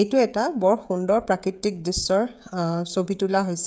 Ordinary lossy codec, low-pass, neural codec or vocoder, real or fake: none; none; codec, 16 kHz, 16 kbps, FreqCodec, smaller model; fake